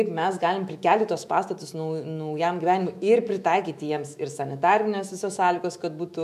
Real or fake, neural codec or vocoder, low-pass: fake; autoencoder, 48 kHz, 128 numbers a frame, DAC-VAE, trained on Japanese speech; 14.4 kHz